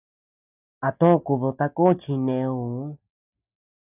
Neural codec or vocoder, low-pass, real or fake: none; 3.6 kHz; real